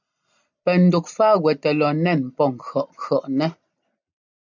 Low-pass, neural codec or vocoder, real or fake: 7.2 kHz; none; real